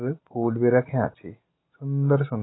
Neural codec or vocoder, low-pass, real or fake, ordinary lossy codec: none; 7.2 kHz; real; AAC, 16 kbps